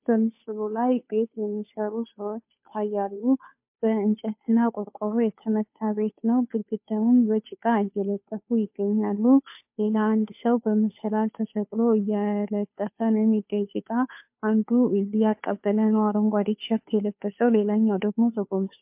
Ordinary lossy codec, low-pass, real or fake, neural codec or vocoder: MP3, 32 kbps; 3.6 kHz; fake; codec, 16 kHz, 2 kbps, FunCodec, trained on Chinese and English, 25 frames a second